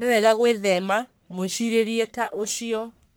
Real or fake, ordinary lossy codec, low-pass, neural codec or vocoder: fake; none; none; codec, 44.1 kHz, 1.7 kbps, Pupu-Codec